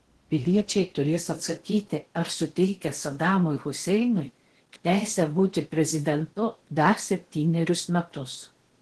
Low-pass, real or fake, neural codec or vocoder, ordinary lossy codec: 10.8 kHz; fake; codec, 16 kHz in and 24 kHz out, 0.6 kbps, FocalCodec, streaming, 4096 codes; Opus, 16 kbps